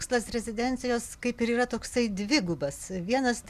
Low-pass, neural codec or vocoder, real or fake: 14.4 kHz; none; real